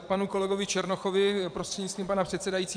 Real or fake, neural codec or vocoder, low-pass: fake; vocoder, 22.05 kHz, 80 mel bands, Vocos; 9.9 kHz